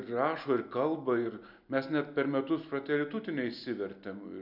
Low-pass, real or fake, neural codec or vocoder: 5.4 kHz; real; none